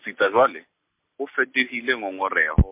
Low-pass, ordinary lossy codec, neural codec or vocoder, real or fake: 3.6 kHz; MP3, 32 kbps; none; real